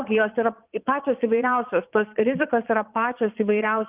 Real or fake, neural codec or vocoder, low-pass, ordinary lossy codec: fake; vocoder, 22.05 kHz, 80 mel bands, Vocos; 3.6 kHz; Opus, 32 kbps